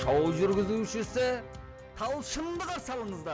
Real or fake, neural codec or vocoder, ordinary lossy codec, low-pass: real; none; none; none